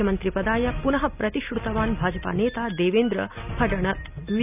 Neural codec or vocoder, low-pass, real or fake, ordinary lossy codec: none; 3.6 kHz; real; none